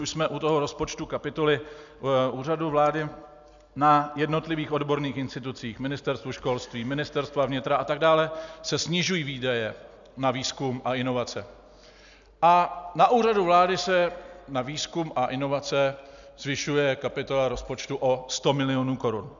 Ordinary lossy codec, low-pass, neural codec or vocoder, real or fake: MP3, 96 kbps; 7.2 kHz; none; real